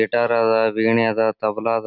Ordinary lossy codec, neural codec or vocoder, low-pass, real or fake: none; none; 5.4 kHz; real